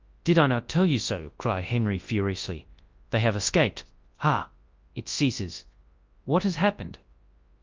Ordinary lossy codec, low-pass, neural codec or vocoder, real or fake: Opus, 24 kbps; 7.2 kHz; codec, 24 kHz, 0.9 kbps, WavTokenizer, large speech release; fake